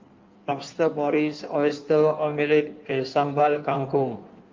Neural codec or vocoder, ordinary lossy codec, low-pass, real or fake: codec, 16 kHz in and 24 kHz out, 1.1 kbps, FireRedTTS-2 codec; Opus, 24 kbps; 7.2 kHz; fake